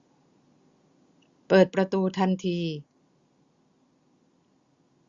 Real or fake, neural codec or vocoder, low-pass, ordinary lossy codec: real; none; 7.2 kHz; Opus, 64 kbps